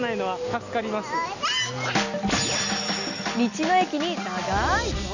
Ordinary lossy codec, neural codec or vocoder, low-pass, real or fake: none; none; 7.2 kHz; real